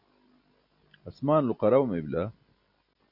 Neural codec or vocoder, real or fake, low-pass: none; real; 5.4 kHz